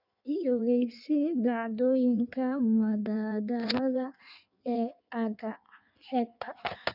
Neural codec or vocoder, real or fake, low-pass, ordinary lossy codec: codec, 16 kHz in and 24 kHz out, 1.1 kbps, FireRedTTS-2 codec; fake; 5.4 kHz; none